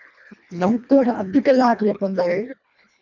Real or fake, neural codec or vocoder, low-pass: fake; codec, 24 kHz, 1.5 kbps, HILCodec; 7.2 kHz